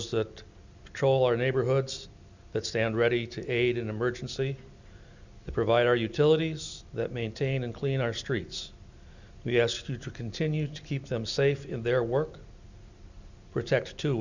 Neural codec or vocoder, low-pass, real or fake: none; 7.2 kHz; real